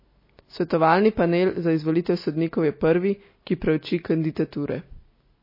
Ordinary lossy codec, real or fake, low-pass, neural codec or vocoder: MP3, 24 kbps; real; 5.4 kHz; none